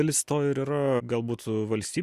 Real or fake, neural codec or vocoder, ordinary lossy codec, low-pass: real; none; Opus, 64 kbps; 14.4 kHz